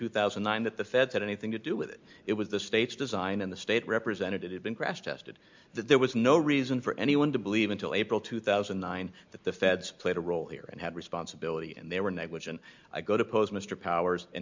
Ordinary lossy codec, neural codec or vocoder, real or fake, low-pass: MP3, 64 kbps; none; real; 7.2 kHz